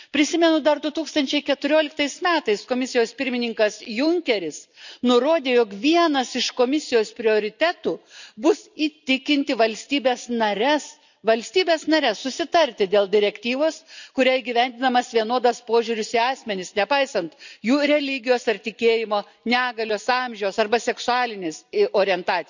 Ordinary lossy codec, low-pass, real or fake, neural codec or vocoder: none; 7.2 kHz; real; none